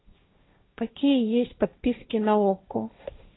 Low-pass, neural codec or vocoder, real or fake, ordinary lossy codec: 7.2 kHz; codec, 16 kHz, 1.1 kbps, Voila-Tokenizer; fake; AAC, 16 kbps